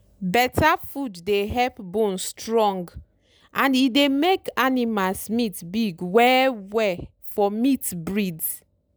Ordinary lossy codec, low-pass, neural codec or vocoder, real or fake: none; none; none; real